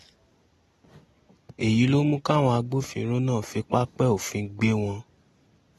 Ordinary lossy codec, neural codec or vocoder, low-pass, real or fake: AAC, 32 kbps; none; 19.8 kHz; real